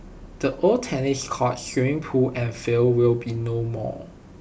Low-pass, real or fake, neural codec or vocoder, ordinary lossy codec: none; real; none; none